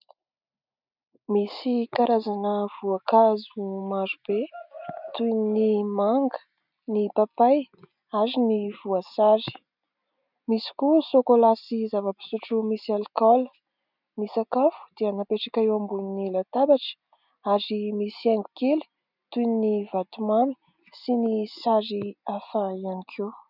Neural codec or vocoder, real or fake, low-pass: none; real; 5.4 kHz